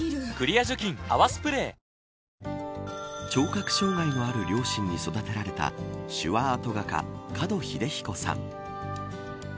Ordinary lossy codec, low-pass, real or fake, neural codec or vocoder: none; none; real; none